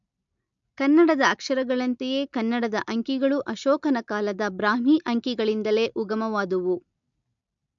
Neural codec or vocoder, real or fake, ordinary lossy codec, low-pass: none; real; MP3, 64 kbps; 7.2 kHz